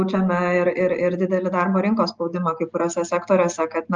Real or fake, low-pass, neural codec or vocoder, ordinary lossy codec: real; 7.2 kHz; none; Opus, 24 kbps